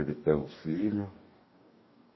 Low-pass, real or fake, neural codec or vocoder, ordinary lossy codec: 7.2 kHz; fake; codec, 44.1 kHz, 2.6 kbps, DAC; MP3, 24 kbps